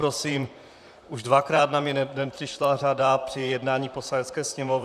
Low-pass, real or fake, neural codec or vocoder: 14.4 kHz; fake; vocoder, 44.1 kHz, 128 mel bands, Pupu-Vocoder